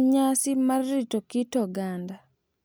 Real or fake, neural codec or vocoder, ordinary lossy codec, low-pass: real; none; none; none